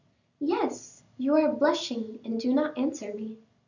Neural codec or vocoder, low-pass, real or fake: none; 7.2 kHz; real